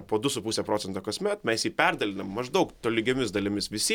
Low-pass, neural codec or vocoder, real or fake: 19.8 kHz; none; real